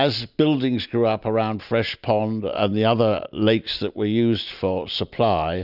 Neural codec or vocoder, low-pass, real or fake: none; 5.4 kHz; real